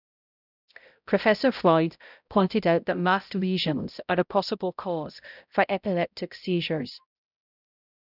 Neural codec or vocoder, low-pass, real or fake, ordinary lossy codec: codec, 16 kHz, 0.5 kbps, X-Codec, HuBERT features, trained on balanced general audio; 5.4 kHz; fake; none